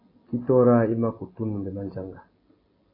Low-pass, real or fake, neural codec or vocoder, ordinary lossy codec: 5.4 kHz; real; none; AAC, 24 kbps